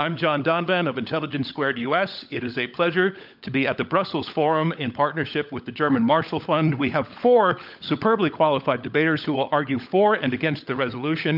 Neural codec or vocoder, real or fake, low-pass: codec, 16 kHz, 16 kbps, FunCodec, trained on LibriTTS, 50 frames a second; fake; 5.4 kHz